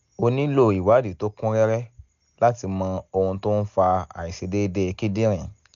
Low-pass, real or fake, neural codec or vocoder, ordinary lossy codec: 7.2 kHz; real; none; none